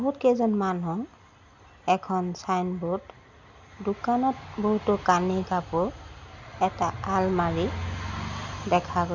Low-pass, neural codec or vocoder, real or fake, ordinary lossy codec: 7.2 kHz; none; real; none